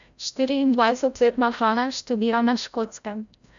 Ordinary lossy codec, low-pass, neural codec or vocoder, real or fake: none; 7.2 kHz; codec, 16 kHz, 0.5 kbps, FreqCodec, larger model; fake